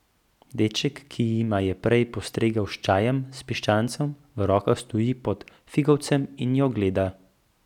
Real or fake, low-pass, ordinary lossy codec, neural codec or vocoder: real; 19.8 kHz; none; none